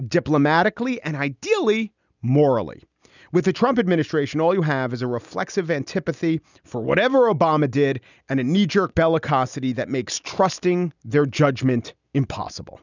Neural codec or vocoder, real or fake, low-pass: none; real; 7.2 kHz